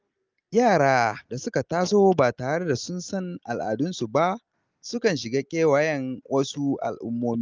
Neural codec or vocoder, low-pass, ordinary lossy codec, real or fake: none; 7.2 kHz; Opus, 24 kbps; real